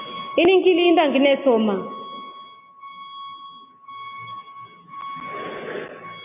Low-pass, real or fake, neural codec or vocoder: 3.6 kHz; real; none